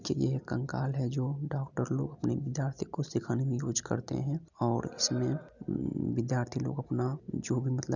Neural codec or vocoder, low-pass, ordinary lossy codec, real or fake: none; 7.2 kHz; none; real